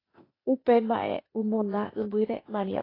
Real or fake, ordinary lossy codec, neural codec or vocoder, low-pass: fake; AAC, 24 kbps; codec, 16 kHz, 0.8 kbps, ZipCodec; 5.4 kHz